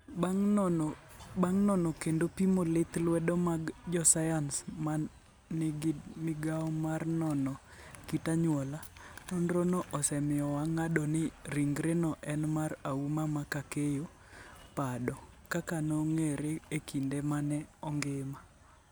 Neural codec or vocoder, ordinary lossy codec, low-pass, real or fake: none; none; none; real